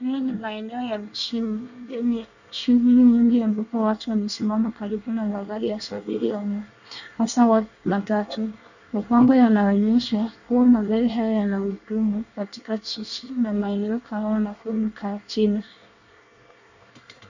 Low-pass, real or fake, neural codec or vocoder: 7.2 kHz; fake; codec, 24 kHz, 1 kbps, SNAC